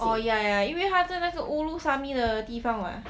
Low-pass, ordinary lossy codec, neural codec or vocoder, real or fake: none; none; none; real